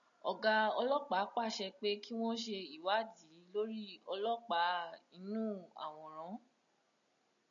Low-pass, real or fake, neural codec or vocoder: 7.2 kHz; real; none